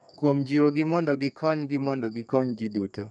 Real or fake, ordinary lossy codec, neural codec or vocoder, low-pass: fake; none; codec, 32 kHz, 1.9 kbps, SNAC; 10.8 kHz